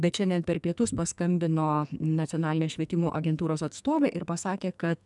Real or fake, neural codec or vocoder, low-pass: fake; codec, 44.1 kHz, 2.6 kbps, SNAC; 10.8 kHz